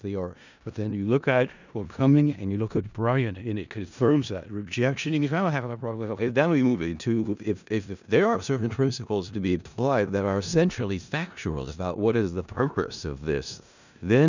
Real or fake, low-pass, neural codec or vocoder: fake; 7.2 kHz; codec, 16 kHz in and 24 kHz out, 0.4 kbps, LongCat-Audio-Codec, four codebook decoder